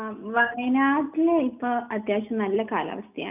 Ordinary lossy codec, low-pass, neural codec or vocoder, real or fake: none; 3.6 kHz; none; real